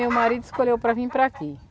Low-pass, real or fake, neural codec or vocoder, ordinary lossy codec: none; real; none; none